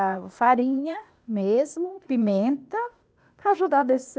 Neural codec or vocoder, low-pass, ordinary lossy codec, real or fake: codec, 16 kHz, 0.8 kbps, ZipCodec; none; none; fake